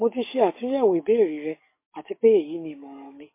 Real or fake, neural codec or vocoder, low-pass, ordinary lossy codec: fake; codec, 44.1 kHz, 7.8 kbps, DAC; 3.6 kHz; MP3, 24 kbps